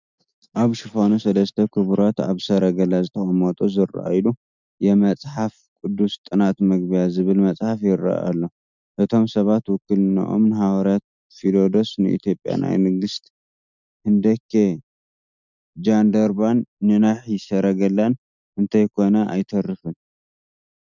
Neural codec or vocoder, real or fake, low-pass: none; real; 7.2 kHz